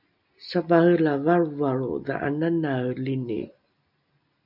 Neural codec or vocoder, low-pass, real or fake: none; 5.4 kHz; real